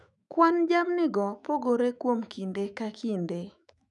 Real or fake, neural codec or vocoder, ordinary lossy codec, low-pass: fake; autoencoder, 48 kHz, 128 numbers a frame, DAC-VAE, trained on Japanese speech; none; 10.8 kHz